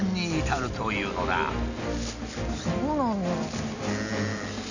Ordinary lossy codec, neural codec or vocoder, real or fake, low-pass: none; none; real; 7.2 kHz